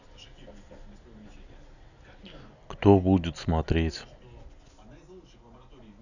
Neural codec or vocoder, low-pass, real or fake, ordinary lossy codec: none; 7.2 kHz; real; none